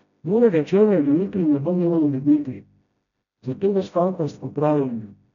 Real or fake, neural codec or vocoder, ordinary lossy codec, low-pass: fake; codec, 16 kHz, 0.5 kbps, FreqCodec, smaller model; none; 7.2 kHz